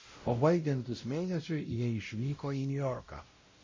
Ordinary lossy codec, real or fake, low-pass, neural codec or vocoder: MP3, 32 kbps; fake; 7.2 kHz; codec, 16 kHz, 0.5 kbps, X-Codec, WavLM features, trained on Multilingual LibriSpeech